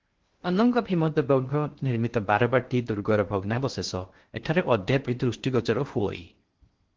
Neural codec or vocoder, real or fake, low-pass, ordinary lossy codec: codec, 16 kHz in and 24 kHz out, 0.6 kbps, FocalCodec, streaming, 2048 codes; fake; 7.2 kHz; Opus, 16 kbps